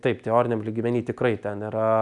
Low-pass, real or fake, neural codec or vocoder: 10.8 kHz; real; none